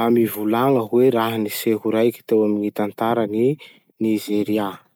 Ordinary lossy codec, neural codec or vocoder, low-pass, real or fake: none; none; none; real